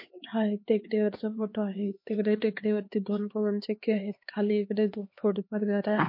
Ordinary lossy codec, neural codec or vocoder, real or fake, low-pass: MP3, 32 kbps; codec, 16 kHz, 2 kbps, X-Codec, HuBERT features, trained on LibriSpeech; fake; 5.4 kHz